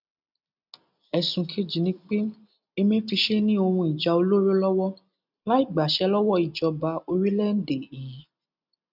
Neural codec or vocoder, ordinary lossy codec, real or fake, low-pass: none; none; real; 5.4 kHz